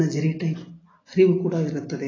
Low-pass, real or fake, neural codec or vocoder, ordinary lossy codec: 7.2 kHz; real; none; AAC, 32 kbps